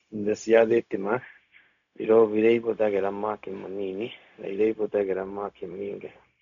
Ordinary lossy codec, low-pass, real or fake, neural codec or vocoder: none; 7.2 kHz; fake; codec, 16 kHz, 0.4 kbps, LongCat-Audio-Codec